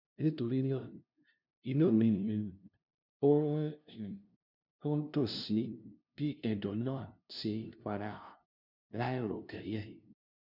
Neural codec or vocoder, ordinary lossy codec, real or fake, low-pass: codec, 16 kHz, 0.5 kbps, FunCodec, trained on LibriTTS, 25 frames a second; none; fake; 5.4 kHz